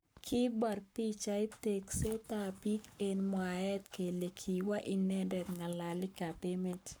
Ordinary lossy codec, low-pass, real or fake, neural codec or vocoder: none; none; fake; codec, 44.1 kHz, 7.8 kbps, Pupu-Codec